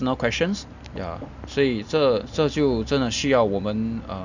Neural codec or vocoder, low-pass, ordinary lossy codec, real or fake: none; 7.2 kHz; none; real